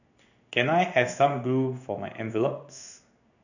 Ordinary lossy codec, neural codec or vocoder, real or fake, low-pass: none; codec, 16 kHz in and 24 kHz out, 1 kbps, XY-Tokenizer; fake; 7.2 kHz